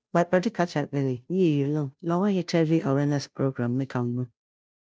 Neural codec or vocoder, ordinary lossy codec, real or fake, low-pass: codec, 16 kHz, 0.5 kbps, FunCodec, trained on Chinese and English, 25 frames a second; none; fake; none